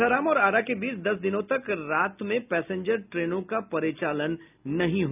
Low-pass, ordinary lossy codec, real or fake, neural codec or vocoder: 3.6 kHz; none; real; none